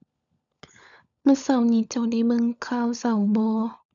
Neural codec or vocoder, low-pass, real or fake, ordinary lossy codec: codec, 16 kHz, 16 kbps, FunCodec, trained on LibriTTS, 50 frames a second; 7.2 kHz; fake; none